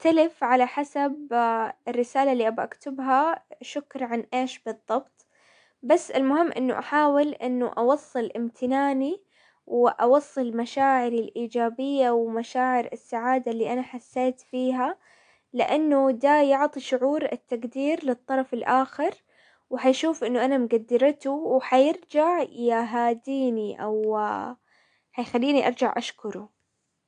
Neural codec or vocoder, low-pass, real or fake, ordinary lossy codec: none; 9.9 kHz; real; AAC, 64 kbps